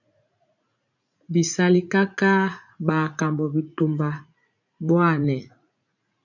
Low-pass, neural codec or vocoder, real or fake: 7.2 kHz; vocoder, 44.1 kHz, 80 mel bands, Vocos; fake